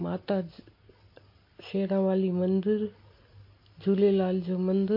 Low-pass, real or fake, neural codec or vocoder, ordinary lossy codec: 5.4 kHz; real; none; MP3, 32 kbps